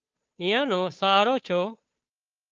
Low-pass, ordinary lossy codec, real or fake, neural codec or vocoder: 7.2 kHz; Opus, 24 kbps; fake; codec, 16 kHz, 2 kbps, FunCodec, trained on Chinese and English, 25 frames a second